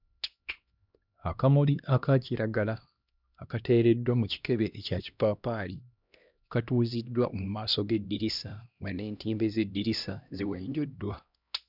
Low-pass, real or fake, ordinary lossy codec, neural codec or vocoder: 5.4 kHz; fake; none; codec, 16 kHz, 1 kbps, X-Codec, HuBERT features, trained on LibriSpeech